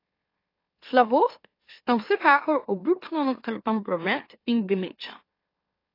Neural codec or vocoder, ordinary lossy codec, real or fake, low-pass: autoencoder, 44.1 kHz, a latent of 192 numbers a frame, MeloTTS; AAC, 32 kbps; fake; 5.4 kHz